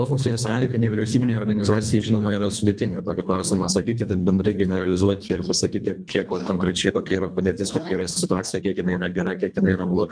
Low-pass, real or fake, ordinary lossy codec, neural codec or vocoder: 9.9 kHz; fake; MP3, 96 kbps; codec, 24 kHz, 1.5 kbps, HILCodec